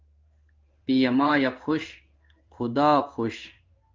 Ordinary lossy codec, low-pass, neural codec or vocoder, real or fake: Opus, 24 kbps; 7.2 kHz; codec, 16 kHz in and 24 kHz out, 1 kbps, XY-Tokenizer; fake